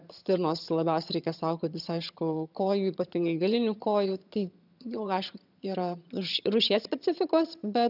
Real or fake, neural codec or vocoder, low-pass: fake; vocoder, 22.05 kHz, 80 mel bands, HiFi-GAN; 5.4 kHz